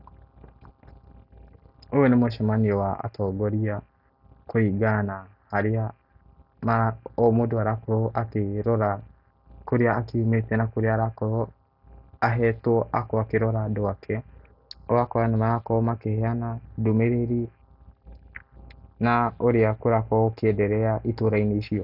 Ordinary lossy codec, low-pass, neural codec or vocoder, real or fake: none; 5.4 kHz; none; real